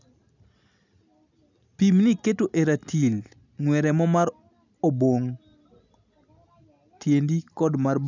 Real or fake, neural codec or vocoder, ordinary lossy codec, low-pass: real; none; none; 7.2 kHz